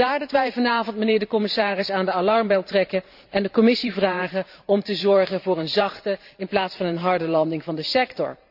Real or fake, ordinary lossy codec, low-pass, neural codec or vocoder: fake; AAC, 48 kbps; 5.4 kHz; vocoder, 44.1 kHz, 128 mel bands every 512 samples, BigVGAN v2